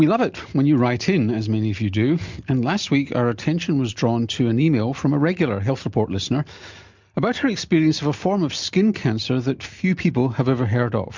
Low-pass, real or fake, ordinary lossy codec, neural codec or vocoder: 7.2 kHz; real; MP3, 64 kbps; none